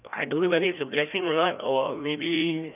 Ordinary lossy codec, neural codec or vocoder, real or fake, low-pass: none; codec, 16 kHz, 1 kbps, FreqCodec, larger model; fake; 3.6 kHz